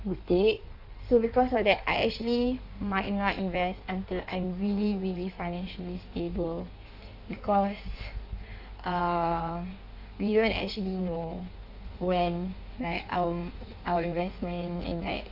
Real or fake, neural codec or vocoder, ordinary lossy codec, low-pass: fake; codec, 16 kHz in and 24 kHz out, 1.1 kbps, FireRedTTS-2 codec; none; 5.4 kHz